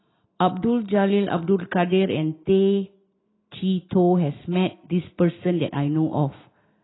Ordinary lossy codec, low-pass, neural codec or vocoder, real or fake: AAC, 16 kbps; 7.2 kHz; none; real